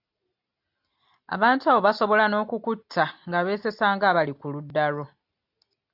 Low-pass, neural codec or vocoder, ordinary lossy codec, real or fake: 5.4 kHz; none; AAC, 48 kbps; real